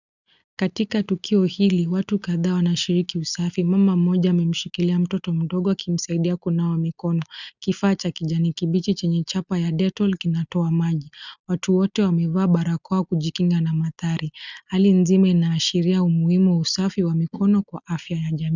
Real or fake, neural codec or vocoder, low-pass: real; none; 7.2 kHz